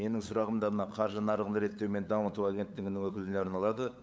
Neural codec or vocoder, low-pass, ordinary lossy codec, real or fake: codec, 16 kHz, 8 kbps, FunCodec, trained on LibriTTS, 25 frames a second; none; none; fake